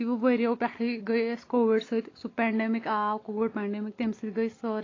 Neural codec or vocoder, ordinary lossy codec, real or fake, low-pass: none; AAC, 32 kbps; real; 7.2 kHz